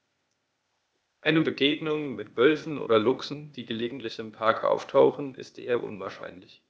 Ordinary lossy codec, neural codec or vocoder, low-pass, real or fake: none; codec, 16 kHz, 0.8 kbps, ZipCodec; none; fake